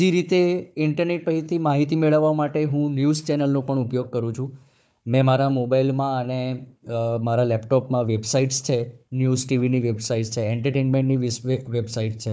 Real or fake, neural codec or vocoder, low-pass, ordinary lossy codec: fake; codec, 16 kHz, 4 kbps, FunCodec, trained on Chinese and English, 50 frames a second; none; none